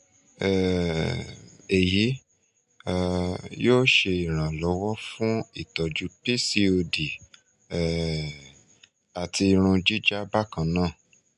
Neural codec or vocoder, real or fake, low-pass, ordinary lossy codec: none; real; 10.8 kHz; MP3, 96 kbps